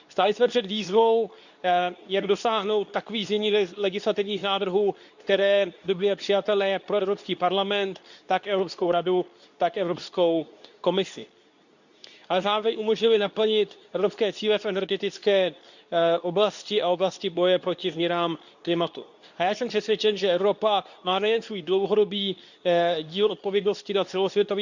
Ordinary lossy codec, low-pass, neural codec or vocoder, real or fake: none; 7.2 kHz; codec, 24 kHz, 0.9 kbps, WavTokenizer, medium speech release version 2; fake